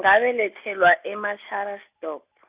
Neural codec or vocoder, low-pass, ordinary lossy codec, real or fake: none; 3.6 kHz; Opus, 64 kbps; real